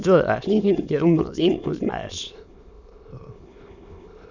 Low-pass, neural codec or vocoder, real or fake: 7.2 kHz; autoencoder, 22.05 kHz, a latent of 192 numbers a frame, VITS, trained on many speakers; fake